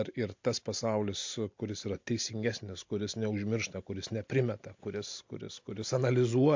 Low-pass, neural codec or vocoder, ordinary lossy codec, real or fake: 7.2 kHz; none; MP3, 48 kbps; real